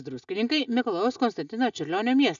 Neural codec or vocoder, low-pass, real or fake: none; 7.2 kHz; real